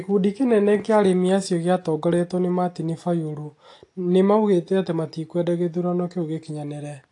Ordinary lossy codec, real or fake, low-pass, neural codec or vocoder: AAC, 48 kbps; real; 10.8 kHz; none